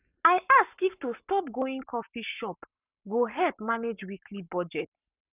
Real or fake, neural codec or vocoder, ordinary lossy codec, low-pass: fake; codec, 44.1 kHz, 7.8 kbps, Pupu-Codec; none; 3.6 kHz